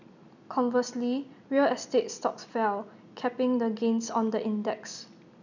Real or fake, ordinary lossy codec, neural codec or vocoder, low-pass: real; none; none; 7.2 kHz